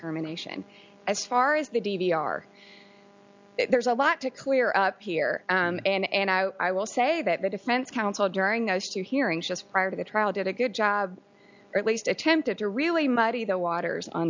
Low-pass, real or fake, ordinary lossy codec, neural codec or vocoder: 7.2 kHz; real; MP3, 64 kbps; none